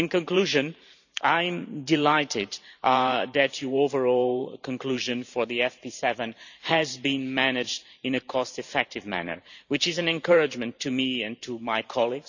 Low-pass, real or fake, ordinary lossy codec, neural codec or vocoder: 7.2 kHz; fake; none; vocoder, 44.1 kHz, 128 mel bands every 512 samples, BigVGAN v2